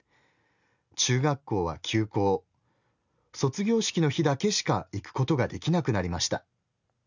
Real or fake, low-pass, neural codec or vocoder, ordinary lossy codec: real; 7.2 kHz; none; none